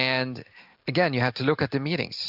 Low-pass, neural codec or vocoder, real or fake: 5.4 kHz; none; real